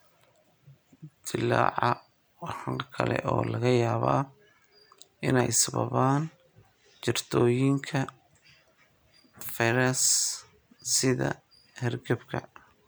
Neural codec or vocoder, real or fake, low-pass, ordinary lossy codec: none; real; none; none